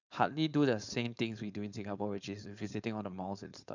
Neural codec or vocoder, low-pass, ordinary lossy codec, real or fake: codec, 16 kHz, 4.8 kbps, FACodec; 7.2 kHz; none; fake